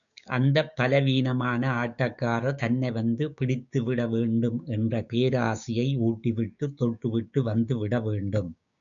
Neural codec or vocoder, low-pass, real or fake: codec, 16 kHz, 6 kbps, DAC; 7.2 kHz; fake